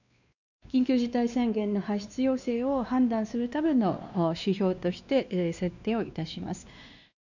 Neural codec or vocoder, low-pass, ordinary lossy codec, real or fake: codec, 16 kHz, 2 kbps, X-Codec, WavLM features, trained on Multilingual LibriSpeech; 7.2 kHz; none; fake